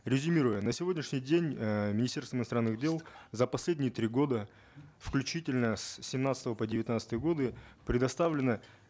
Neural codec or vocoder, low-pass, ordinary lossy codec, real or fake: none; none; none; real